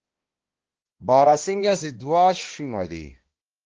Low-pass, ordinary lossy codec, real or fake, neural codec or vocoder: 7.2 kHz; Opus, 16 kbps; fake; codec, 16 kHz, 1 kbps, X-Codec, HuBERT features, trained on balanced general audio